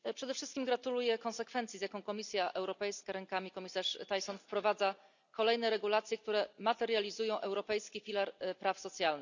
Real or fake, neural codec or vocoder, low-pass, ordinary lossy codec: real; none; 7.2 kHz; none